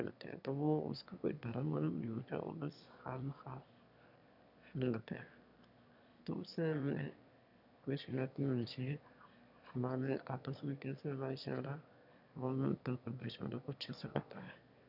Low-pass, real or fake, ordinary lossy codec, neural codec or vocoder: 5.4 kHz; fake; none; autoencoder, 22.05 kHz, a latent of 192 numbers a frame, VITS, trained on one speaker